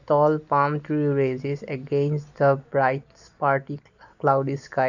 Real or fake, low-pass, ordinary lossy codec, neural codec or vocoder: real; 7.2 kHz; none; none